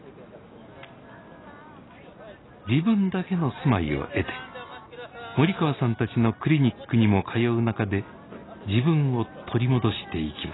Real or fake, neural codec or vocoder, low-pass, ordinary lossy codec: real; none; 7.2 kHz; AAC, 16 kbps